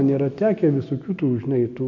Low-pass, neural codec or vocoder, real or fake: 7.2 kHz; none; real